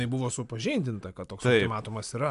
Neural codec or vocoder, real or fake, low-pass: none; real; 10.8 kHz